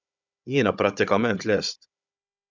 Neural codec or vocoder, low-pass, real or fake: codec, 16 kHz, 16 kbps, FunCodec, trained on Chinese and English, 50 frames a second; 7.2 kHz; fake